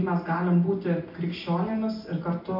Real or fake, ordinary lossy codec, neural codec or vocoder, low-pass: real; MP3, 32 kbps; none; 5.4 kHz